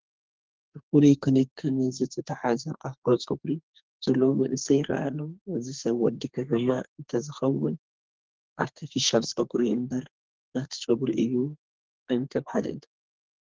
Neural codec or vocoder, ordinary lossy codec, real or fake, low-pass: codec, 32 kHz, 1.9 kbps, SNAC; Opus, 16 kbps; fake; 7.2 kHz